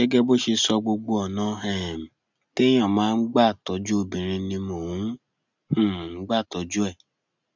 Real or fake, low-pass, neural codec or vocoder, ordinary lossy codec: real; 7.2 kHz; none; none